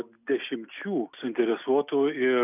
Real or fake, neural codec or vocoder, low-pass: real; none; 3.6 kHz